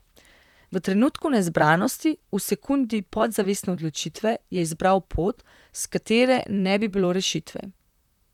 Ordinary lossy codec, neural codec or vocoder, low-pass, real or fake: none; vocoder, 44.1 kHz, 128 mel bands, Pupu-Vocoder; 19.8 kHz; fake